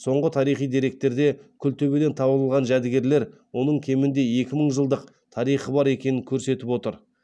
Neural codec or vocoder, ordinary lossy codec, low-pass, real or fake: none; none; none; real